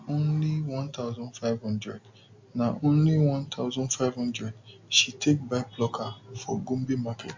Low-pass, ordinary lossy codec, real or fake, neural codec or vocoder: 7.2 kHz; MP3, 48 kbps; real; none